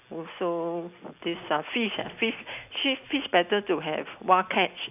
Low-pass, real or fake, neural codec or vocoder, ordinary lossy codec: 3.6 kHz; real; none; none